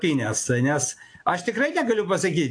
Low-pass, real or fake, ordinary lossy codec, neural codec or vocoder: 9.9 kHz; real; AAC, 48 kbps; none